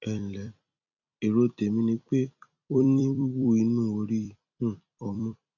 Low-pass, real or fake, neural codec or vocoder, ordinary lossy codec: 7.2 kHz; fake; vocoder, 44.1 kHz, 128 mel bands every 256 samples, BigVGAN v2; none